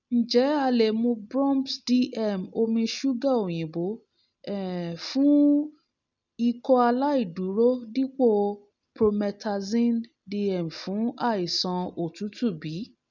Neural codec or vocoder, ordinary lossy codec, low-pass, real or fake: none; none; 7.2 kHz; real